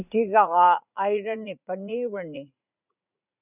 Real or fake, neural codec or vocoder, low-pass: fake; vocoder, 44.1 kHz, 80 mel bands, Vocos; 3.6 kHz